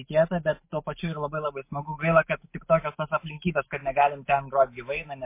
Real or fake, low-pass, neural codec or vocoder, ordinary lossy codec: fake; 3.6 kHz; autoencoder, 48 kHz, 128 numbers a frame, DAC-VAE, trained on Japanese speech; MP3, 24 kbps